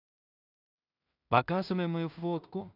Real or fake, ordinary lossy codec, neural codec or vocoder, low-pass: fake; none; codec, 16 kHz in and 24 kHz out, 0.4 kbps, LongCat-Audio-Codec, two codebook decoder; 5.4 kHz